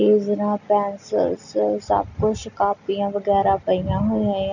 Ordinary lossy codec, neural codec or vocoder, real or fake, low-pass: none; none; real; 7.2 kHz